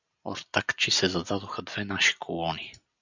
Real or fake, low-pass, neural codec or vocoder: real; 7.2 kHz; none